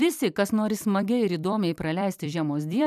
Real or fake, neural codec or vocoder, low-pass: fake; vocoder, 44.1 kHz, 128 mel bands every 256 samples, BigVGAN v2; 14.4 kHz